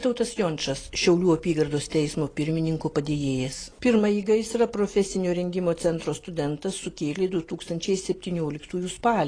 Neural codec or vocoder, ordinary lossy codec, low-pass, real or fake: none; AAC, 32 kbps; 9.9 kHz; real